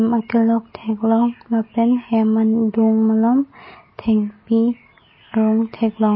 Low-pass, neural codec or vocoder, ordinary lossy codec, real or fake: 7.2 kHz; none; MP3, 24 kbps; real